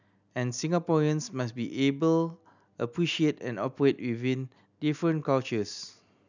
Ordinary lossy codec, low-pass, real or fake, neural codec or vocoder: none; 7.2 kHz; real; none